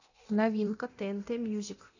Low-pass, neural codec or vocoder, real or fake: 7.2 kHz; codec, 24 kHz, 0.9 kbps, DualCodec; fake